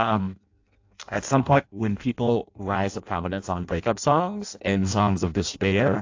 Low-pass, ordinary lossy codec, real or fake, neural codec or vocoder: 7.2 kHz; AAC, 48 kbps; fake; codec, 16 kHz in and 24 kHz out, 0.6 kbps, FireRedTTS-2 codec